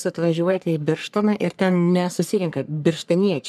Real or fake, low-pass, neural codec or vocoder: fake; 14.4 kHz; codec, 44.1 kHz, 3.4 kbps, Pupu-Codec